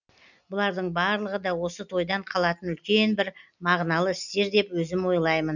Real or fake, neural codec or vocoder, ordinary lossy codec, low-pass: real; none; none; 7.2 kHz